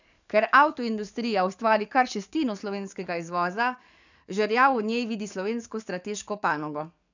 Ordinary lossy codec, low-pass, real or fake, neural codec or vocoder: none; 7.2 kHz; fake; codec, 44.1 kHz, 7.8 kbps, DAC